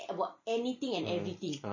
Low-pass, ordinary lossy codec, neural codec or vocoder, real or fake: 7.2 kHz; MP3, 32 kbps; none; real